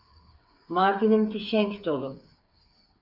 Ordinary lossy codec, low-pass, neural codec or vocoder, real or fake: AAC, 48 kbps; 5.4 kHz; codec, 16 kHz, 8 kbps, FreqCodec, smaller model; fake